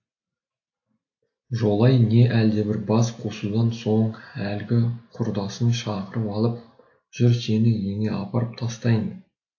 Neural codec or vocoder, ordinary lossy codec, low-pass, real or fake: none; AAC, 48 kbps; 7.2 kHz; real